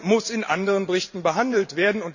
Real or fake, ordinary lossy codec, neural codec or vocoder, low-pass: fake; none; vocoder, 44.1 kHz, 128 mel bands every 512 samples, BigVGAN v2; 7.2 kHz